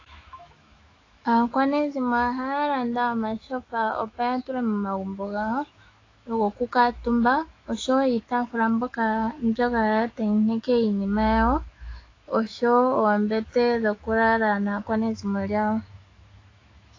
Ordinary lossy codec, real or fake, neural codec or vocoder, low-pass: AAC, 32 kbps; fake; autoencoder, 48 kHz, 128 numbers a frame, DAC-VAE, trained on Japanese speech; 7.2 kHz